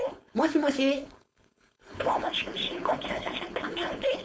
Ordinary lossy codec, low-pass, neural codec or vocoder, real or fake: none; none; codec, 16 kHz, 4.8 kbps, FACodec; fake